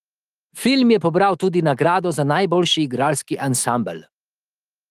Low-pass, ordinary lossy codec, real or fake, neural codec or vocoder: 14.4 kHz; Opus, 24 kbps; fake; autoencoder, 48 kHz, 128 numbers a frame, DAC-VAE, trained on Japanese speech